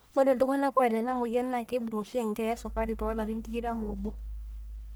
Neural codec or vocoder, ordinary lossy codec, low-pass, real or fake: codec, 44.1 kHz, 1.7 kbps, Pupu-Codec; none; none; fake